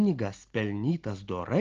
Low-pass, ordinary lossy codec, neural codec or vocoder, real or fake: 7.2 kHz; Opus, 16 kbps; none; real